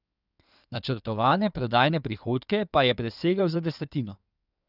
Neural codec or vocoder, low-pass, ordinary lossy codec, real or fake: codec, 16 kHz in and 24 kHz out, 2.2 kbps, FireRedTTS-2 codec; 5.4 kHz; none; fake